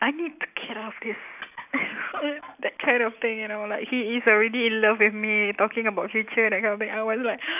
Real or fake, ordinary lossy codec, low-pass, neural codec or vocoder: real; none; 3.6 kHz; none